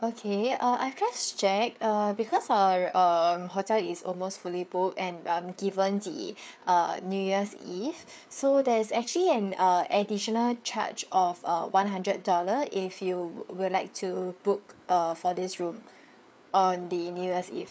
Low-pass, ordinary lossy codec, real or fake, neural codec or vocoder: none; none; fake; codec, 16 kHz, 4 kbps, FreqCodec, larger model